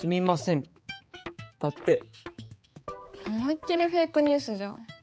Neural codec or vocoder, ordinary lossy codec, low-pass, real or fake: codec, 16 kHz, 4 kbps, X-Codec, HuBERT features, trained on balanced general audio; none; none; fake